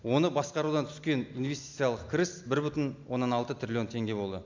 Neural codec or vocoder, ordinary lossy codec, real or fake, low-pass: none; MP3, 64 kbps; real; 7.2 kHz